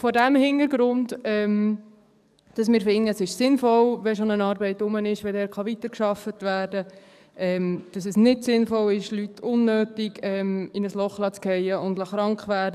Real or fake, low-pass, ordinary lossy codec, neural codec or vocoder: fake; 14.4 kHz; none; codec, 44.1 kHz, 7.8 kbps, DAC